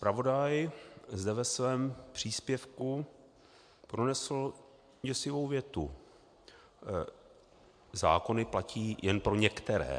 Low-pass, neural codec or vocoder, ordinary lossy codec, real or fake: 9.9 kHz; none; MP3, 64 kbps; real